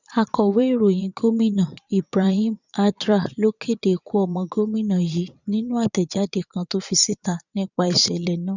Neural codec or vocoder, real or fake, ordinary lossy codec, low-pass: vocoder, 22.05 kHz, 80 mel bands, Vocos; fake; none; 7.2 kHz